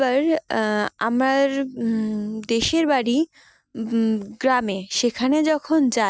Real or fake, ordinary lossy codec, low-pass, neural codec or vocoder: real; none; none; none